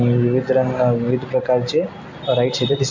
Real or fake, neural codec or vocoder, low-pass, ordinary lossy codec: real; none; 7.2 kHz; MP3, 48 kbps